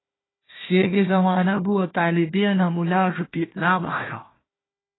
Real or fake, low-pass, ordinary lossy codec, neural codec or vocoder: fake; 7.2 kHz; AAC, 16 kbps; codec, 16 kHz, 1 kbps, FunCodec, trained on Chinese and English, 50 frames a second